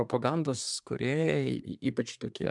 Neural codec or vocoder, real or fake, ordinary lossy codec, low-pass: codec, 24 kHz, 1 kbps, SNAC; fake; MP3, 96 kbps; 10.8 kHz